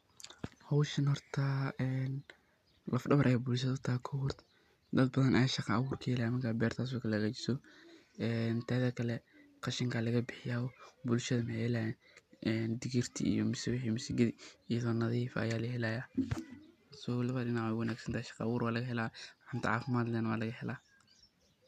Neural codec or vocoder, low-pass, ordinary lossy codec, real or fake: none; 14.4 kHz; none; real